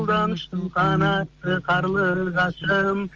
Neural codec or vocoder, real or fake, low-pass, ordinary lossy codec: none; real; 7.2 kHz; Opus, 24 kbps